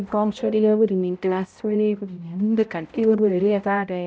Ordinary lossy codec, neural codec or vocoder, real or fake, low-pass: none; codec, 16 kHz, 0.5 kbps, X-Codec, HuBERT features, trained on balanced general audio; fake; none